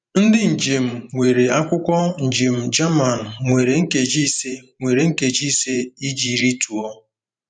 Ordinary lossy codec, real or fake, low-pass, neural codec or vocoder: none; fake; 9.9 kHz; vocoder, 44.1 kHz, 128 mel bands every 512 samples, BigVGAN v2